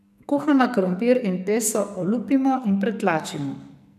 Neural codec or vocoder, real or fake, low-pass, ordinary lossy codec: codec, 44.1 kHz, 2.6 kbps, SNAC; fake; 14.4 kHz; none